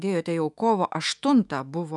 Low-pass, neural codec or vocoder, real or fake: 10.8 kHz; autoencoder, 48 kHz, 128 numbers a frame, DAC-VAE, trained on Japanese speech; fake